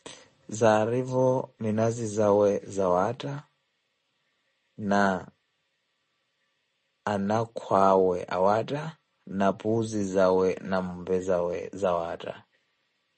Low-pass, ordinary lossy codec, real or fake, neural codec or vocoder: 9.9 kHz; MP3, 32 kbps; real; none